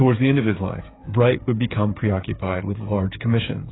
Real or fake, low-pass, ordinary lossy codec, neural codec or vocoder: fake; 7.2 kHz; AAC, 16 kbps; codec, 16 kHz, 8 kbps, FreqCodec, smaller model